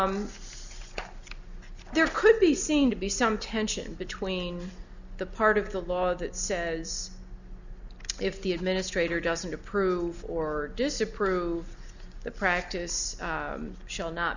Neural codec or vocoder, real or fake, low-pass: none; real; 7.2 kHz